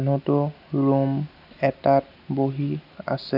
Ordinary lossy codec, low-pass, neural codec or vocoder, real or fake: none; 5.4 kHz; none; real